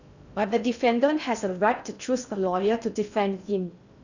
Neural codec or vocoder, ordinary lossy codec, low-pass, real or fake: codec, 16 kHz in and 24 kHz out, 0.6 kbps, FocalCodec, streaming, 4096 codes; none; 7.2 kHz; fake